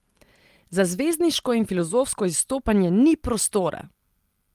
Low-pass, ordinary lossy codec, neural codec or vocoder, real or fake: 14.4 kHz; Opus, 24 kbps; none; real